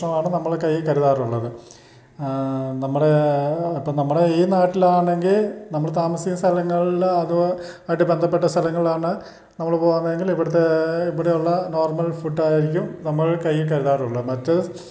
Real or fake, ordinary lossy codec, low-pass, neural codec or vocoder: real; none; none; none